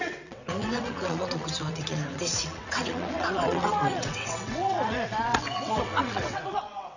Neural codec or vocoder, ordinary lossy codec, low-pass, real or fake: vocoder, 22.05 kHz, 80 mel bands, WaveNeXt; none; 7.2 kHz; fake